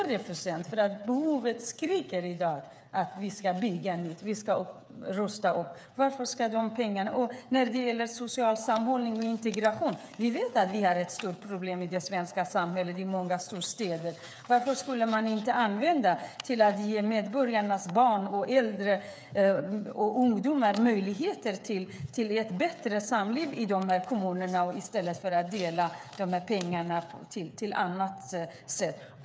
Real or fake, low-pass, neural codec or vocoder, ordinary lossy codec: fake; none; codec, 16 kHz, 8 kbps, FreqCodec, smaller model; none